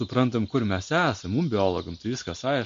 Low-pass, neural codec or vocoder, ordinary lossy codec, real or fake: 7.2 kHz; none; MP3, 48 kbps; real